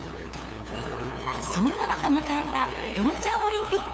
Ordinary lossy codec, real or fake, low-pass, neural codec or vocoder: none; fake; none; codec, 16 kHz, 2 kbps, FunCodec, trained on LibriTTS, 25 frames a second